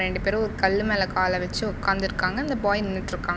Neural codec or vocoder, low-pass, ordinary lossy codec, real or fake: none; none; none; real